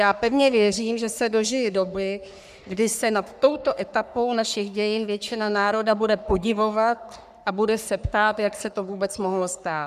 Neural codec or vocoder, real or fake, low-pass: codec, 44.1 kHz, 3.4 kbps, Pupu-Codec; fake; 14.4 kHz